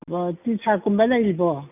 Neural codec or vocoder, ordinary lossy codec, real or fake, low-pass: none; none; real; 3.6 kHz